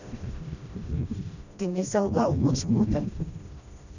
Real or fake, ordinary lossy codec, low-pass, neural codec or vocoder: fake; none; 7.2 kHz; codec, 16 kHz, 1 kbps, FreqCodec, smaller model